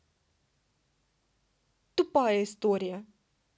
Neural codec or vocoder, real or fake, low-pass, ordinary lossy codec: none; real; none; none